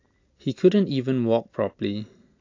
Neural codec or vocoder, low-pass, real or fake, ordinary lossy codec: none; 7.2 kHz; real; AAC, 48 kbps